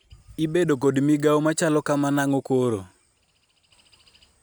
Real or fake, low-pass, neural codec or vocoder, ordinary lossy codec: real; none; none; none